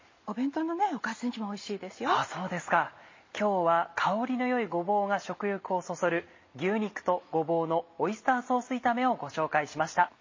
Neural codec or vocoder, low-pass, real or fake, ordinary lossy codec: none; 7.2 kHz; real; MP3, 32 kbps